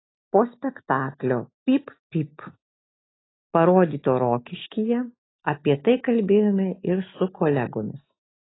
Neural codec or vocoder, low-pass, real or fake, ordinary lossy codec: none; 7.2 kHz; real; AAC, 16 kbps